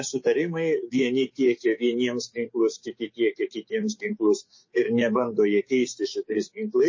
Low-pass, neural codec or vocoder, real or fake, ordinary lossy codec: 7.2 kHz; codec, 16 kHz, 6 kbps, DAC; fake; MP3, 32 kbps